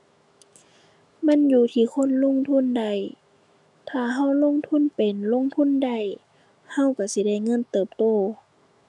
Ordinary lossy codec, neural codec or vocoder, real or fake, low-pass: none; autoencoder, 48 kHz, 128 numbers a frame, DAC-VAE, trained on Japanese speech; fake; 10.8 kHz